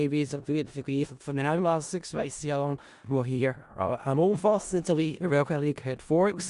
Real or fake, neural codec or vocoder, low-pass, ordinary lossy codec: fake; codec, 16 kHz in and 24 kHz out, 0.4 kbps, LongCat-Audio-Codec, four codebook decoder; 10.8 kHz; Opus, 64 kbps